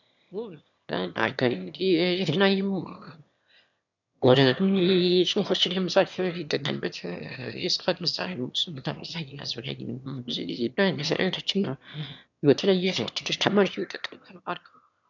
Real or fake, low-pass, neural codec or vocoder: fake; 7.2 kHz; autoencoder, 22.05 kHz, a latent of 192 numbers a frame, VITS, trained on one speaker